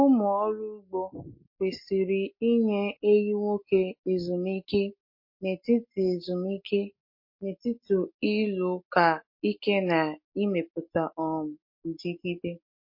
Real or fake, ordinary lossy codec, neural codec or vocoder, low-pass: real; MP3, 32 kbps; none; 5.4 kHz